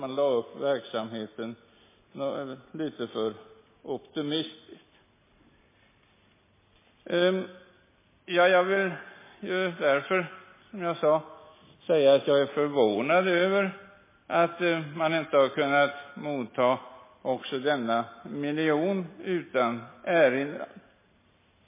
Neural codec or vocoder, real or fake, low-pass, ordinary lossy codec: none; real; 3.6 kHz; MP3, 16 kbps